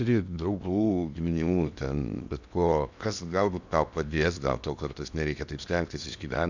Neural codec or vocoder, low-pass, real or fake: codec, 16 kHz in and 24 kHz out, 0.8 kbps, FocalCodec, streaming, 65536 codes; 7.2 kHz; fake